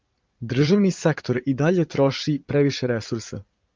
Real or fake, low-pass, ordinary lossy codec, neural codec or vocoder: fake; 7.2 kHz; Opus, 32 kbps; vocoder, 44.1 kHz, 80 mel bands, Vocos